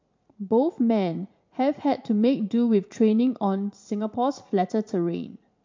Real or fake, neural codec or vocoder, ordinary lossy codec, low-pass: fake; vocoder, 44.1 kHz, 80 mel bands, Vocos; MP3, 48 kbps; 7.2 kHz